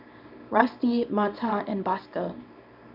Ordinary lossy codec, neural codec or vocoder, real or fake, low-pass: none; codec, 24 kHz, 0.9 kbps, WavTokenizer, small release; fake; 5.4 kHz